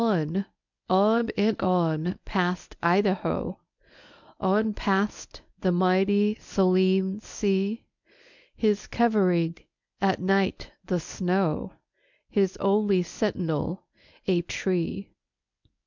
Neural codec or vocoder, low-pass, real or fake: codec, 24 kHz, 0.9 kbps, WavTokenizer, medium speech release version 1; 7.2 kHz; fake